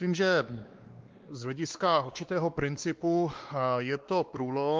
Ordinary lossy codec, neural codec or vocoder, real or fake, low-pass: Opus, 32 kbps; codec, 16 kHz, 2 kbps, X-Codec, WavLM features, trained on Multilingual LibriSpeech; fake; 7.2 kHz